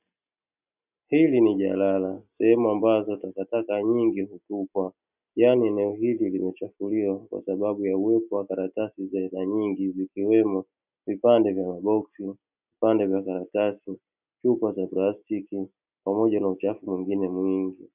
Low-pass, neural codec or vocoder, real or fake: 3.6 kHz; none; real